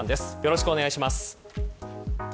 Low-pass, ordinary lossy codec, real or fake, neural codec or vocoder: none; none; real; none